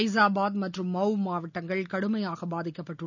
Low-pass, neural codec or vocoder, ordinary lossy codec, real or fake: 7.2 kHz; none; none; real